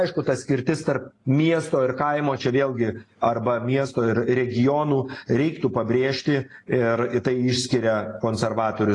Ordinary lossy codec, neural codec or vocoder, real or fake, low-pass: AAC, 32 kbps; none; real; 10.8 kHz